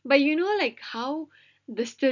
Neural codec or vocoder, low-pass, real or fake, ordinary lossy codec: none; 7.2 kHz; real; none